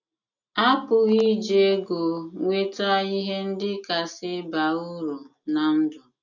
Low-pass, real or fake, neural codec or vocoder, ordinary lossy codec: 7.2 kHz; real; none; none